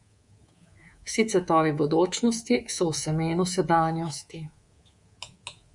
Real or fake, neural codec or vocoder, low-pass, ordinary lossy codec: fake; codec, 24 kHz, 3.1 kbps, DualCodec; 10.8 kHz; Opus, 64 kbps